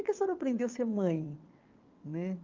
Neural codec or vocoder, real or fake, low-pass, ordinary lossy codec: vocoder, 22.05 kHz, 80 mel bands, WaveNeXt; fake; 7.2 kHz; Opus, 32 kbps